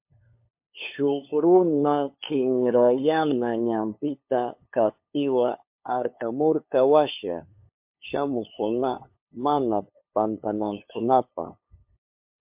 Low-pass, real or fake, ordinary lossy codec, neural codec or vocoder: 3.6 kHz; fake; MP3, 32 kbps; codec, 16 kHz, 2 kbps, FunCodec, trained on LibriTTS, 25 frames a second